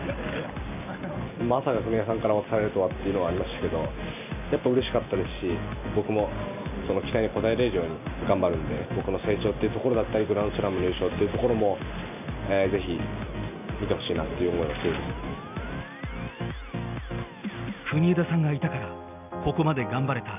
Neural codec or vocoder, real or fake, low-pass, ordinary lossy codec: none; real; 3.6 kHz; none